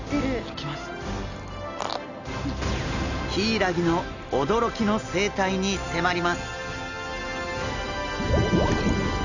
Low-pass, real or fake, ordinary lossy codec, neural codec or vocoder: 7.2 kHz; real; none; none